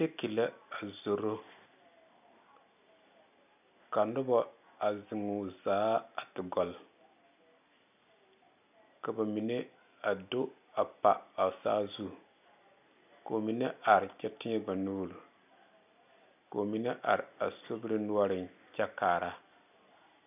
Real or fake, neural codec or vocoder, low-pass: real; none; 3.6 kHz